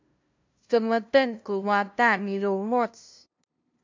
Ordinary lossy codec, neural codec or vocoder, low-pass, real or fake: MP3, 64 kbps; codec, 16 kHz, 0.5 kbps, FunCodec, trained on LibriTTS, 25 frames a second; 7.2 kHz; fake